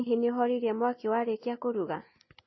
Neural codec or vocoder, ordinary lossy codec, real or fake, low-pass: none; MP3, 24 kbps; real; 7.2 kHz